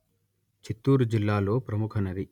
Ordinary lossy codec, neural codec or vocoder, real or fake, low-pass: none; none; real; 19.8 kHz